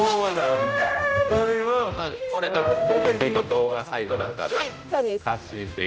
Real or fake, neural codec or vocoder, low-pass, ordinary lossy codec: fake; codec, 16 kHz, 0.5 kbps, X-Codec, HuBERT features, trained on general audio; none; none